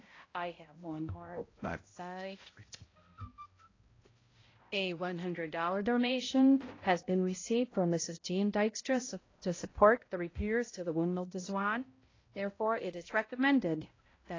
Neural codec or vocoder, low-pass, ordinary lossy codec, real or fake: codec, 16 kHz, 0.5 kbps, X-Codec, HuBERT features, trained on balanced general audio; 7.2 kHz; AAC, 32 kbps; fake